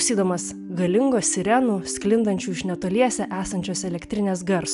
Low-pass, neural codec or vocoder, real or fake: 10.8 kHz; none; real